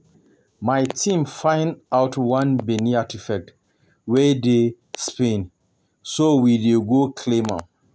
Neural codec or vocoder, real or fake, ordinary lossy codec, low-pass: none; real; none; none